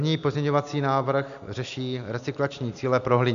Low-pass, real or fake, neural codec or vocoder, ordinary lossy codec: 7.2 kHz; real; none; MP3, 96 kbps